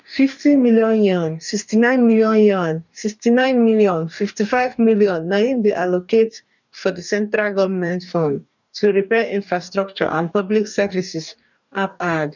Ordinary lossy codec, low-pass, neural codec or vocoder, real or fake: none; 7.2 kHz; codec, 44.1 kHz, 2.6 kbps, DAC; fake